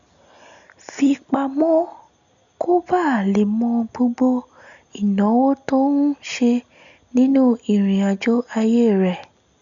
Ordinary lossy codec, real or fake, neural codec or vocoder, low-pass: none; real; none; 7.2 kHz